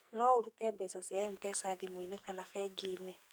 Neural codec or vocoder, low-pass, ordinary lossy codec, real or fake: codec, 44.1 kHz, 2.6 kbps, SNAC; none; none; fake